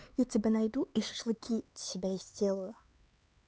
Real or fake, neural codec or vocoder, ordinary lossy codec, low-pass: fake; codec, 16 kHz, 4 kbps, X-Codec, HuBERT features, trained on LibriSpeech; none; none